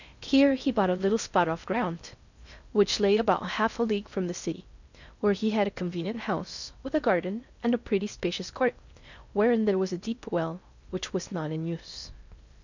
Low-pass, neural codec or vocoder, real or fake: 7.2 kHz; codec, 16 kHz in and 24 kHz out, 0.6 kbps, FocalCodec, streaming, 4096 codes; fake